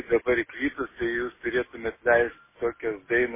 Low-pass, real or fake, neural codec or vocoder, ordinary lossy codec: 3.6 kHz; real; none; MP3, 16 kbps